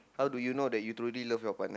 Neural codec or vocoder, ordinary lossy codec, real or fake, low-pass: none; none; real; none